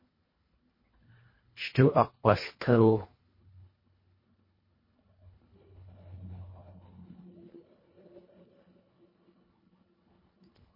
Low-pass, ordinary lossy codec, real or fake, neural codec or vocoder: 5.4 kHz; MP3, 24 kbps; fake; codec, 24 kHz, 1.5 kbps, HILCodec